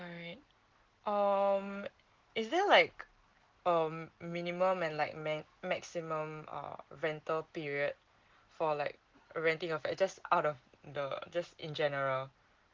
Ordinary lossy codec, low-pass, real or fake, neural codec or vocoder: Opus, 16 kbps; 7.2 kHz; real; none